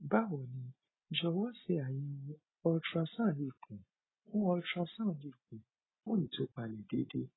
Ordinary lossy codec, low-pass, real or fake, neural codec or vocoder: AAC, 16 kbps; 7.2 kHz; real; none